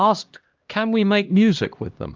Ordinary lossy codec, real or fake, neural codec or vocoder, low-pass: Opus, 32 kbps; fake; codec, 16 kHz, 1 kbps, X-Codec, HuBERT features, trained on LibriSpeech; 7.2 kHz